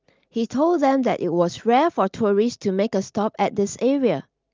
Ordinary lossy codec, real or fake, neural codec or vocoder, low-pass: Opus, 24 kbps; real; none; 7.2 kHz